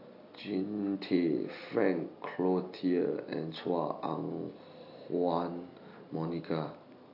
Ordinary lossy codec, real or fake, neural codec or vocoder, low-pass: AAC, 48 kbps; real; none; 5.4 kHz